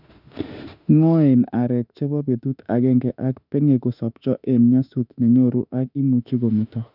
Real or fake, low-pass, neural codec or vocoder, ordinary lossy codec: fake; 5.4 kHz; autoencoder, 48 kHz, 32 numbers a frame, DAC-VAE, trained on Japanese speech; none